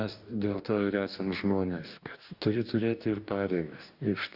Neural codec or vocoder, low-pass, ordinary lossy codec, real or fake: codec, 44.1 kHz, 2.6 kbps, DAC; 5.4 kHz; Opus, 64 kbps; fake